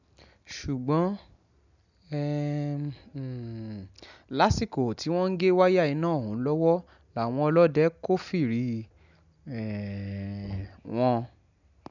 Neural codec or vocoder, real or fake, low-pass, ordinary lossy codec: none; real; 7.2 kHz; none